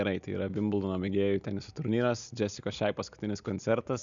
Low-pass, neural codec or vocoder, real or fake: 7.2 kHz; none; real